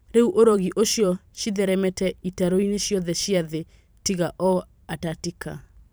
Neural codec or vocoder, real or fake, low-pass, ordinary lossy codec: vocoder, 44.1 kHz, 128 mel bands every 512 samples, BigVGAN v2; fake; none; none